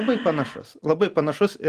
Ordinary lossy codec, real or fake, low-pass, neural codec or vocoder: Opus, 24 kbps; real; 14.4 kHz; none